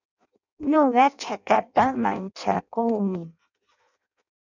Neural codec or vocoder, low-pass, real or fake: codec, 16 kHz in and 24 kHz out, 0.6 kbps, FireRedTTS-2 codec; 7.2 kHz; fake